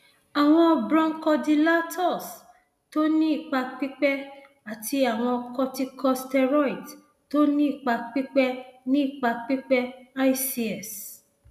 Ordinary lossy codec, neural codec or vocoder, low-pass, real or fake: none; none; 14.4 kHz; real